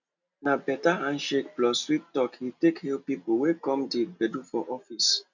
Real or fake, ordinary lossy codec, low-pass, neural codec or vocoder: real; none; 7.2 kHz; none